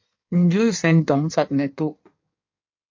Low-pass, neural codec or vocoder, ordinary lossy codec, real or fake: 7.2 kHz; codec, 16 kHz in and 24 kHz out, 1.1 kbps, FireRedTTS-2 codec; MP3, 48 kbps; fake